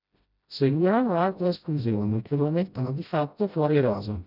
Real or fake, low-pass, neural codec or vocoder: fake; 5.4 kHz; codec, 16 kHz, 0.5 kbps, FreqCodec, smaller model